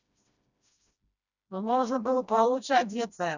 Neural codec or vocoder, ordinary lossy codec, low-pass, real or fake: codec, 16 kHz, 1 kbps, FreqCodec, smaller model; none; 7.2 kHz; fake